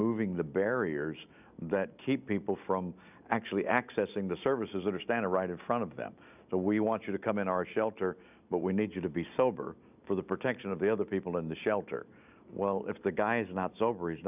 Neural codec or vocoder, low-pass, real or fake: none; 3.6 kHz; real